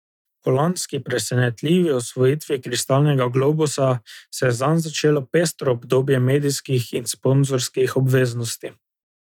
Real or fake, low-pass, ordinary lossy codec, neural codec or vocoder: real; 19.8 kHz; none; none